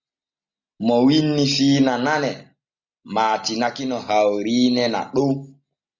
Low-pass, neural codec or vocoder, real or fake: 7.2 kHz; none; real